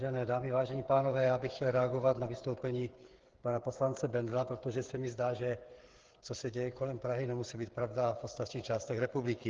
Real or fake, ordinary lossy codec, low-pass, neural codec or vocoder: fake; Opus, 16 kbps; 7.2 kHz; codec, 16 kHz, 8 kbps, FreqCodec, smaller model